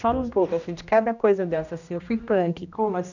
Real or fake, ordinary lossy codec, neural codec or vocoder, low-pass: fake; none; codec, 16 kHz, 1 kbps, X-Codec, HuBERT features, trained on general audio; 7.2 kHz